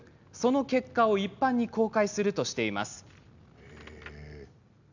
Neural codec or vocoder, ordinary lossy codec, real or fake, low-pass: none; none; real; 7.2 kHz